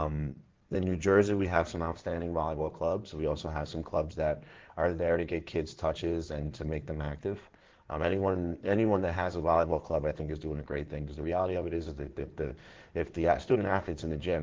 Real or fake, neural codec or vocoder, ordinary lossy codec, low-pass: fake; codec, 16 kHz in and 24 kHz out, 2.2 kbps, FireRedTTS-2 codec; Opus, 16 kbps; 7.2 kHz